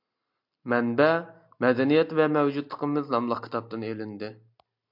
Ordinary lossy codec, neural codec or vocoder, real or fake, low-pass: MP3, 48 kbps; none; real; 5.4 kHz